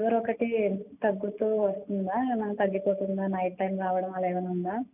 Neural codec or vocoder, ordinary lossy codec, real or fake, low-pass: none; none; real; 3.6 kHz